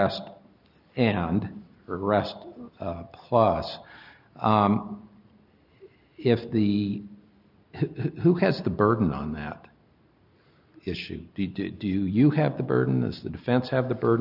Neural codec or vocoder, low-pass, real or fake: none; 5.4 kHz; real